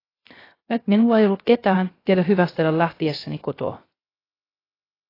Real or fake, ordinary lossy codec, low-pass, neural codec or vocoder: fake; AAC, 24 kbps; 5.4 kHz; codec, 16 kHz, 0.3 kbps, FocalCodec